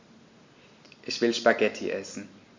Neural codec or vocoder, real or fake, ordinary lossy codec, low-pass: none; real; MP3, 48 kbps; 7.2 kHz